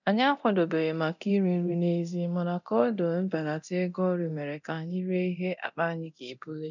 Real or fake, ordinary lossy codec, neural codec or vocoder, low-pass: fake; none; codec, 24 kHz, 0.9 kbps, DualCodec; 7.2 kHz